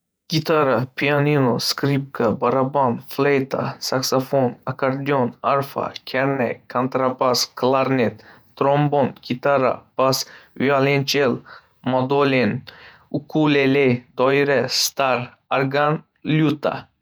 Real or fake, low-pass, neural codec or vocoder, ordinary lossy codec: fake; none; vocoder, 48 kHz, 128 mel bands, Vocos; none